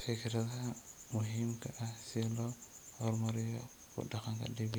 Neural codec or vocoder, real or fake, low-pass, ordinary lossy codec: none; real; none; none